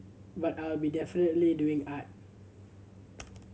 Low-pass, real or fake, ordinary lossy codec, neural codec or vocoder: none; real; none; none